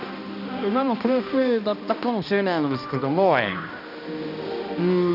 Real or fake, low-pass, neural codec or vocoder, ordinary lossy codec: fake; 5.4 kHz; codec, 16 kHz, 1 kbps, X-Codec, HuBERT features, trained on balanced general audio; none